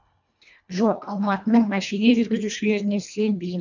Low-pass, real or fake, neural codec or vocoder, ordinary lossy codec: 7.2 kHz; fake; codec, 24 kHz, 1.5 kbps, HILCodec; none